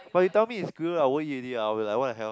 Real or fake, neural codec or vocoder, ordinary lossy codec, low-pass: real; none; none; none